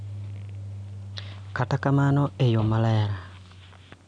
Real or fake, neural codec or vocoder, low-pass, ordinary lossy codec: real; none; 9.9 kHz; none